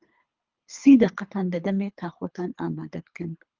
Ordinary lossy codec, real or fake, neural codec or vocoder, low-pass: Opus, 24 kbps; fake; codec, 24 kHz, 3 kbps, HILCodec; 7.2 kHz